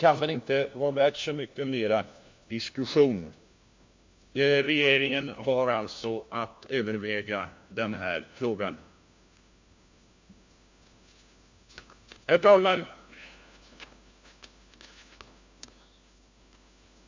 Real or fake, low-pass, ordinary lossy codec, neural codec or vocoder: fake; 7.2 kHz; MP3, 48 kbps; codec, 16 kHz, 1 kbps, FunCodec, trained on LibriTTS, 50 frames a second